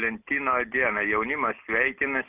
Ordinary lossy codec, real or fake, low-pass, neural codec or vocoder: Opus, 64 kbps; real; 3.6 kHz; none